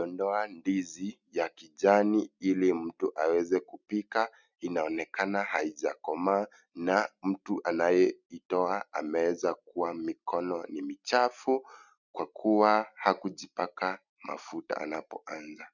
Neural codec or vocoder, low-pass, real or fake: none; 7.2 kHz; real